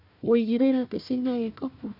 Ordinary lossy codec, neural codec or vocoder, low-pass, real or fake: none; codec, 16 kHz, 1 kbps, FunCodec, trained on Chinese and English, 50 frames a second; 5.4 kHz; fake